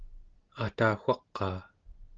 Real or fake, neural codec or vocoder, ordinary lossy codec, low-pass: real; none; Opus, 16 kbps; 7.2 kHz